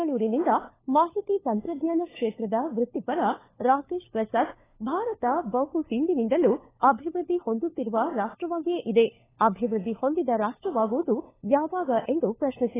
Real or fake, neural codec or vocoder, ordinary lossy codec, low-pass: fake; codec, 16 kHz, 4 kbps, FunCodec, trained on LibriTTS, 50 frames a second; AAC, 16 kbps; 3.6 kHz